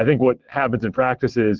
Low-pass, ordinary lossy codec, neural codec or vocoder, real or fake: 7.2 kHz; Opus, 16 kbps; vocoder, 22.05 kHz, 80 mel bands, WaveNeXt; fake